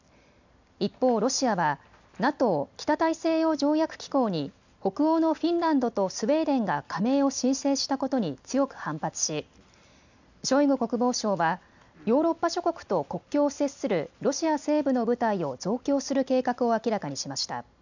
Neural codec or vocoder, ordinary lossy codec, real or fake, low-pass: none; none; real; 7.2 kHz